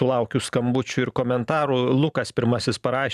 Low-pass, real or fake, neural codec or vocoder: 14.4 kHz; fake; vocoder, 44.1 kHz, 128 mel bands every 512 samples, BigVGAN v2